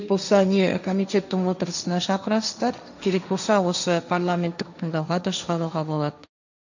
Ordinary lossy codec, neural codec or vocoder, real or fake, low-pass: none; codec, 16 kHz, 1.1 kbps, Voila-Tokenizer; fake; 7.2 kHz